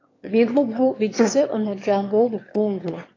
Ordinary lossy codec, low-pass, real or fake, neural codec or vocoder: AAC, 32 kbps; 7.2 kHz; fake; autoencoder, 22.05 kHz, a latent of 192 numbers a frame, VITS, trained on one speaker